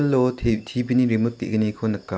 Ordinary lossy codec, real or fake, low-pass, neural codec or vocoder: none; real; none; none